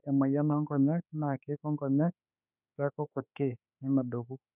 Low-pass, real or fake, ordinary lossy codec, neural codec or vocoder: 3.6 kHz; fake; none; autoencoder, 48 kHz, 32 numbers a frame, DAC-VAE, trained on Japanese speech